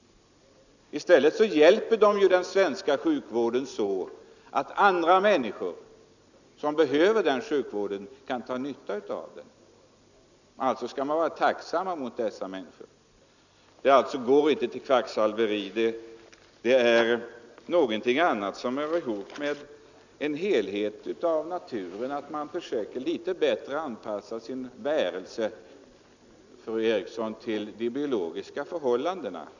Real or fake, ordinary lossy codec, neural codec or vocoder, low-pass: real; none; none; 7.2 kHz